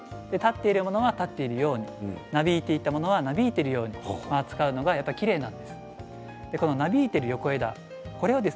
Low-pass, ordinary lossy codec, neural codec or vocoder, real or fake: none; none; none; real